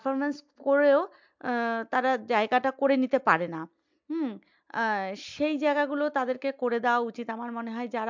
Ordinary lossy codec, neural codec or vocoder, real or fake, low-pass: MP3, 64 kbps; none; real; 7.2 kHz